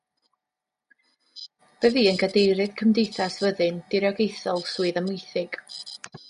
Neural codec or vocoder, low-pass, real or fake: none; 10.8 kHz; real